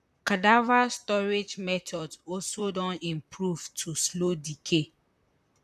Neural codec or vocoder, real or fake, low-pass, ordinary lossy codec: vocoder, 44.1 kHz, 128 mel bands, Pupu-Vocoder; fake; 14.4 kHz; none